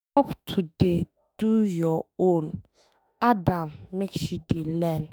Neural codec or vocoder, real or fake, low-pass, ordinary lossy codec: autoencoder, 48 kHz, 32 numbers a frame, DAC-VAE, trained on Japanese speech; fake; none; none